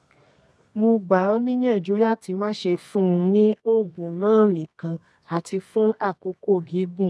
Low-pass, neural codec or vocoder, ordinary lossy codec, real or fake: none; codec, 24 kHz, 0.9 kbps, WavTokenizer, medium music audio release; none; fake